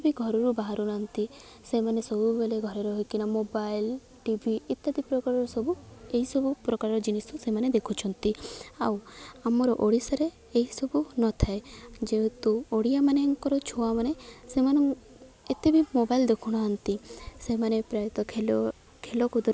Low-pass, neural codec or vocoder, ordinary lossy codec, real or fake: none; none; none; real